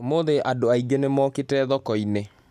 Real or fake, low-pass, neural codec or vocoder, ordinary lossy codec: real; 14.4 kHz; none; none